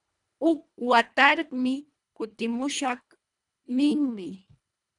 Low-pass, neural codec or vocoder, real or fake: 10.8 kHz; codec, 24 kHz, 1.5 kbps, HILCodec; fake